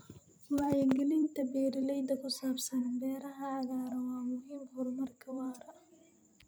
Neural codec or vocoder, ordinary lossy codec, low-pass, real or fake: vocoder, 44.1 kHz, 128 mel bands every 256 samples, BigVGAN v2; none; none; fake